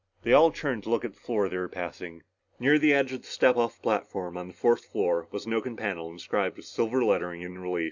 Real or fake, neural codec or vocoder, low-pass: real; none; 7.2 kHz